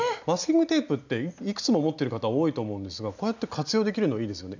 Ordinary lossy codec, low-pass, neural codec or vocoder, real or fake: none; 7.2 kHz; none; real